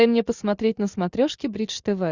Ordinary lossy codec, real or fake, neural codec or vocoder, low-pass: Opus, 64 kbps; real; none; 7.2 kHz